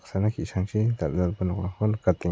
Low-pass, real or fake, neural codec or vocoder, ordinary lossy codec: none; real; none; none